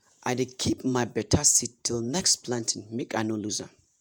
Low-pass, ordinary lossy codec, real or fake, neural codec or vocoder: none; none; fake; vocoder, 48 kHz, 128 mel bands, Vocos